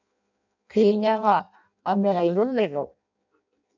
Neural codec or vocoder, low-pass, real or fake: codec, 16 kHz in and 24 kHz out, 0.6 kbps, FireRedTTS-2 codec; 7.2 kHz; fake